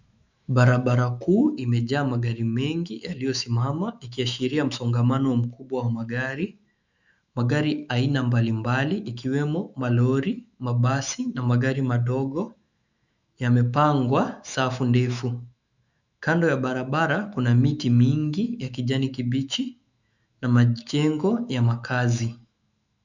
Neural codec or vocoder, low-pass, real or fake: autoencoder, 48 kHz, 128 numbers a frame, DAC-VAE, trained on Japanese speech; 7.2 kHz; fake